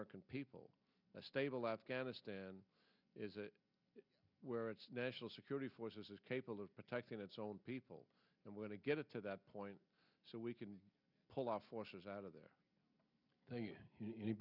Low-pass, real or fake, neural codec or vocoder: 5.4 kHz; real; none